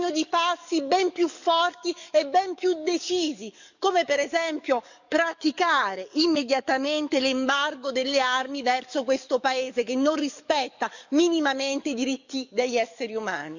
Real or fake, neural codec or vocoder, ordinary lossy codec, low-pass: fake; codec, 44.1 kHz, 7.8 kbps, DAC; none; 7.2 kHz